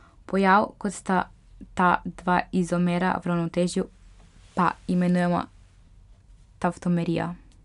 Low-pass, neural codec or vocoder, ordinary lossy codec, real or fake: 10.8 kHz; none; MP3, 96 kbps; real